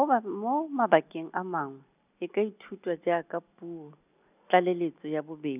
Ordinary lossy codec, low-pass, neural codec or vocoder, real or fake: none; 3.6 kHz; none; real